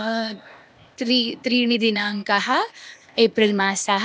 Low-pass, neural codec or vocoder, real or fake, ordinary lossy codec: none; codec, 16 kHz, 0.8 kbps, ZipCodec; fake; none